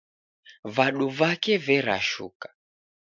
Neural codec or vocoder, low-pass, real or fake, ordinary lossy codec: none; 7.2 kHz; real; MP3, 64 kbps